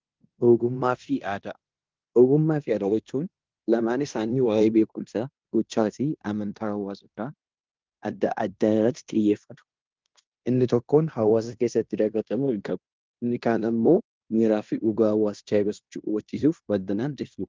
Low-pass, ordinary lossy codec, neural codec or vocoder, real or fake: 7.2 kHz; Opus, 32 kbps; codec, 16 kHz in and 24 kHz out, 0.9 kbps, LongCat-Audio-Codec, fine tuned four codebook decoder; fake